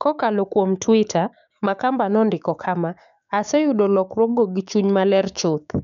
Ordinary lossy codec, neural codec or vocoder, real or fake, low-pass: none; codec, 16 kHz, 6 kbps, DAC; fake; 7.2 kHz